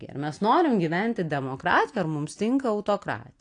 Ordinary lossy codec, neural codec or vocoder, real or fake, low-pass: AAC, 48 kbps; none; real; 9.9 kHz